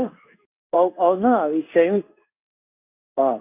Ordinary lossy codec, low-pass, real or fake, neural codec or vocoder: none; 3.6 kHz; fake; codec, 16 kHz in and 24 kHz out, 1 kbps, XY-Tokenizer